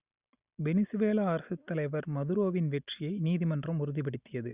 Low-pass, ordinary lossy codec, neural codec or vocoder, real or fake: 3.6 kHz; Opus, 64 kbps; none; real